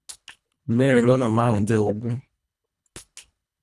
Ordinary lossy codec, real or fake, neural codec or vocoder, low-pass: none; fake; codec, 24 kHz, 1.5 kbps, HILCodec; none